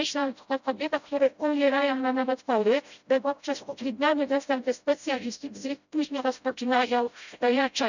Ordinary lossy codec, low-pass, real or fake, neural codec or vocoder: none; 7.2 kHz; fake; codec, 16 kHz, 0.5 kbps, FreqCodec, smaller model